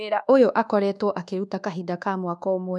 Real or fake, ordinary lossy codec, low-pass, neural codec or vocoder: fake; none; none; codec, 24 kHz, 1.2 kbps, DualCodec